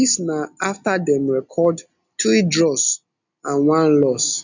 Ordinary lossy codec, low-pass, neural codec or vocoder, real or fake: none; 7.2 kHz; none; real